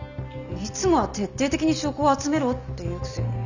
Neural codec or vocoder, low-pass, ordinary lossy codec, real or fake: none; 7.2 kHz; none; real